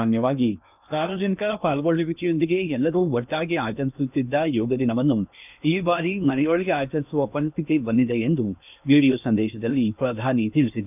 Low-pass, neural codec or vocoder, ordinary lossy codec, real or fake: 3.6 kHz; codec, 16 kHz in and 24 kHz out, 0.8 kbps, FocalCodec, streaming, 65536 codes; none; fake